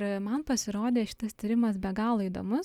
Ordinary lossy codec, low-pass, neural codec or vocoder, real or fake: Opus, 64 kbps; 19.8 kHz; none; real